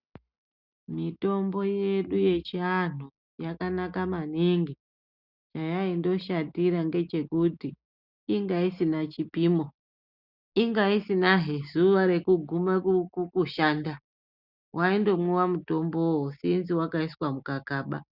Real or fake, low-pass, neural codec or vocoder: real; 5.4 kHz; none